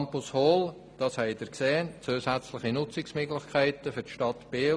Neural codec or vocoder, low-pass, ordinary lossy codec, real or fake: none; none; none; real